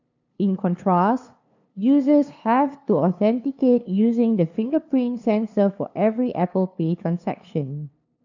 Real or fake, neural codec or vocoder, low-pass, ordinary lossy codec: fake; codec, 16 kHz, 2 kbps, FunCodec, trained on LibriTTS, 25 frames a second; 7.2 kHz; none